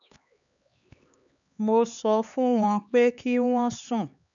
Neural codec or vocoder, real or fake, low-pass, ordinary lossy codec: codec, 16 kHz, 4 kbps, X-Codec, HuBERT features, trained on LibriSpeech; fake; 7.2 kHz; none